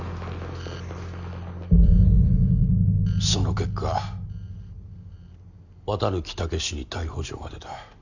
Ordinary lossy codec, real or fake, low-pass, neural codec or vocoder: Opus, 64 kbps; real; 7.2 kHz; none